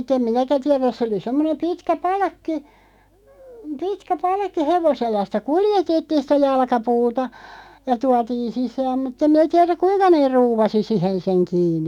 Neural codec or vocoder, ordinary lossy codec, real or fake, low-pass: autoencoder, 48 kHz, 128 numbers a frame, DAC-VAE, trained on Japanese speech; none; fake; 19.8 kHz